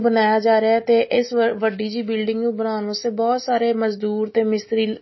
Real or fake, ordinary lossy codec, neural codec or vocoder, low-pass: real; MP3, 24 kbps; none; 7.2 kHz